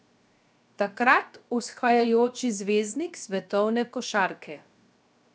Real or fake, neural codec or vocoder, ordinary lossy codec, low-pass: fake; codec, 16 kHz, 0.7 kbps, FocalCodec; none; none